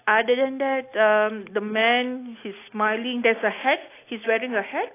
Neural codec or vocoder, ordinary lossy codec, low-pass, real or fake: none; AAC, 24 kbps; 3.6 kHz; real